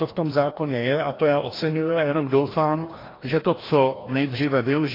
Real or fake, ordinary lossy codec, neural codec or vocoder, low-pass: fake; AAC, 24 kbps; codec, 16 kHz, 1 kbps, FreqCodec, larger model; 5.4 kHz